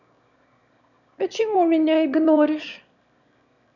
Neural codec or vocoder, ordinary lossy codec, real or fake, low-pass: autoencoder, 22.05 kHz, a latent of 192 numbers a frame, VITS, trained on one speaker; none; fake; 7.2 kHz